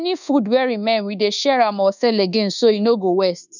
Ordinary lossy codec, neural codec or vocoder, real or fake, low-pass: none; codec, 24 kHz, 1.2 kbps, DualCodec; fake; 7.2 kHz